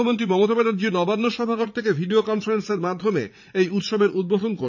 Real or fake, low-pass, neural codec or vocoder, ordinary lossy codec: fake; 7.2 kHz; vocoder, 44.1 kHz, 80 mel bands, Vocos; none